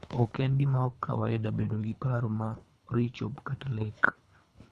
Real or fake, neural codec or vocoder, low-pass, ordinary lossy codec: fake; codec, 24 kHz, 3 kbps, HILCodec; none; none